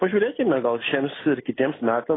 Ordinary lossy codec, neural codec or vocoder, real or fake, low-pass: AAC, 16 kbps; none; real; 7.2 kHz